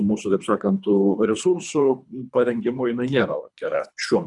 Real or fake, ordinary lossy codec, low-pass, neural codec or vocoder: fake; MP3, 96 kbps; 10.8 kHz; codec, 24 kHz, 3 kbps, HILCodec